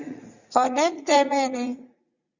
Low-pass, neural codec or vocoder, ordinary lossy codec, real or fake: 7.2 kHz; vocoder, 44.1 kHz, 80 mel bands, Vocos; Opus, 64 kbps; fake